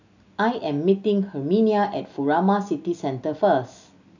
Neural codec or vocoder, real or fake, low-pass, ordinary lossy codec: none; real; 7.2 kHz; none